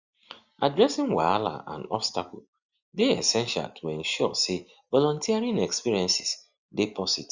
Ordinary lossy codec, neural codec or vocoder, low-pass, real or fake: Opus, 64 kbps; none; 7.2 kHz; real